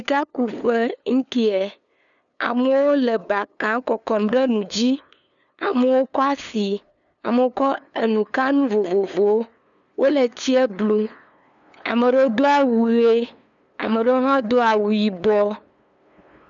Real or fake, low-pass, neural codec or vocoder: fake; 7.2 kHz; codec, 16 kHz, 2 kbps, FunCodec, trained on LibriTTS, 25 frames a second